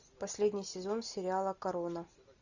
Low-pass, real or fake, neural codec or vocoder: 7.2 kHz; real; none